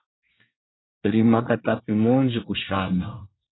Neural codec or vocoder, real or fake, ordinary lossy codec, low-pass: codec, 24 kHz, 1 kbps, SNAC; fake; AAC, 16 kbps; 7.2 kHz